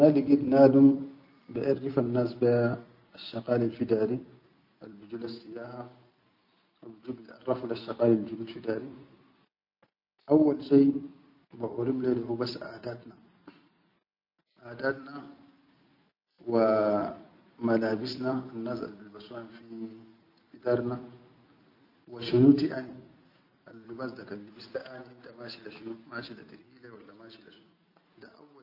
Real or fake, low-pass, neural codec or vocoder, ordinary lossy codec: real; 5.4 kHz; none; none